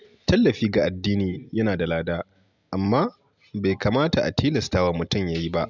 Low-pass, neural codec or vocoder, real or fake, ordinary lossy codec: 7.2 kHz; none; real; none